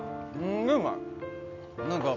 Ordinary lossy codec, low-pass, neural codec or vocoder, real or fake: none; 7.2 kHz; none; real